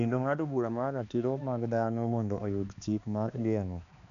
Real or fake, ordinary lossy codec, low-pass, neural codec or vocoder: fake; none; 7.2 kHz; codec, 16 kHz, 2 kbps, X-Codec, HuBERT features, trained on balanced general audio